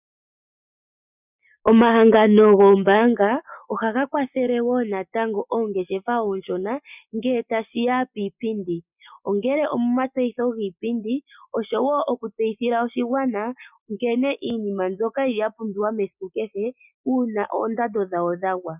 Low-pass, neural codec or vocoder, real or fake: 3.6 kHz; none; real